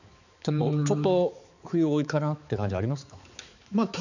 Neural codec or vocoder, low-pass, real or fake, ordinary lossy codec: codec, 16 kHz, 4 kbps, X-Codec, HuBERT features, trained on balanced general audio; 7.2 kHz; fake; none